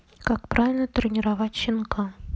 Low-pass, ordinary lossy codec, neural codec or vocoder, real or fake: none; none; none; real